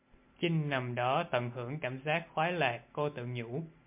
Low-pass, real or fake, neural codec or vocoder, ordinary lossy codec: 3.6 kHz; real; none; MP3, 32 kbps